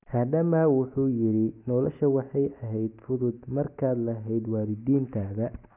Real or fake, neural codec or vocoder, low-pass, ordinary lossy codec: real; none; 3.6 kHz; none